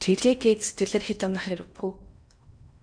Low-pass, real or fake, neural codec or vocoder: 9.9 kHz; fake; codec, 16 kHz in and 24 kHz out, 0.6 kbps, FocalCodec, streaming, 4096 codes